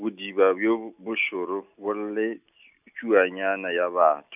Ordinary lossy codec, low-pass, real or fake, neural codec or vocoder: none; 3.6 kHz; real; none